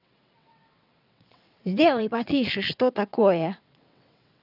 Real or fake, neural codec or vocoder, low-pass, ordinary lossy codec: fake; vocoder, 22.05 kHz, 80 mel bands, WaveNeXt; 5.4 kHz; none